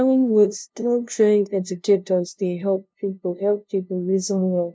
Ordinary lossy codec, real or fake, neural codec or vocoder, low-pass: none; fake; codec, 16 kHz, 0.5 kbps, FunCodec, trained on LibriTTS, 25 frames a second; none